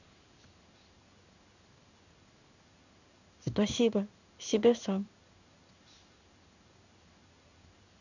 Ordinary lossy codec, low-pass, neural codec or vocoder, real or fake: none; 7.2 kHz; codec, 16 kHz in and 24 kHz out, 1 kbps, XY-Tokenizer; fake